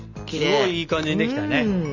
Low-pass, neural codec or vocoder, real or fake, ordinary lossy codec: 7.2 kHz; none; real; none